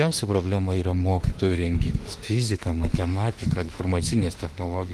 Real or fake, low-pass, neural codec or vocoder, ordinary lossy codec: fake; 14.4 kHz; autoencoder, 48 kHz, 32 numbers a frame, DAC-VAE, trained on Japanese speech; Opus, 24 kbps